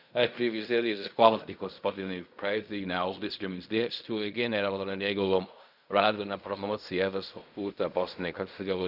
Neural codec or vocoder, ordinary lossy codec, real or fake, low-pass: codec, 16 kHz in and 24 kHz out, 0.4 kbps, LongCat-Audio-Codec, fine tuned four codebook decoder; none; fake; 5.4 kHz